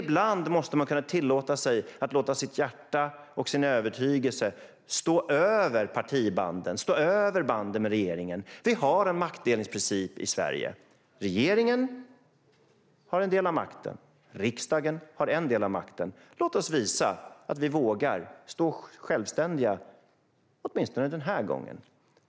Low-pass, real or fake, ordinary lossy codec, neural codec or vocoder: none; real; none; none